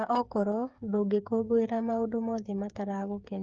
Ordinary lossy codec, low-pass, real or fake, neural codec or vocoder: Opus, 24 kbps; 7.2 kHz; fake; codec, 16 kHz, 8 kbps, FreqCodec, smaller model